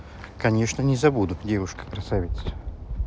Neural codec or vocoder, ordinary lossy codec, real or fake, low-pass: none; none; real; none